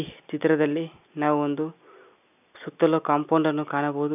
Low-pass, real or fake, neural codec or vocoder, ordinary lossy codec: 3.6 kHz; real; none; none